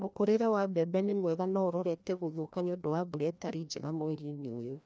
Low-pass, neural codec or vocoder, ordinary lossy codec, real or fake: none; codec, 16 kHz, 1 kbps, FreqCodec, larger model; none; fake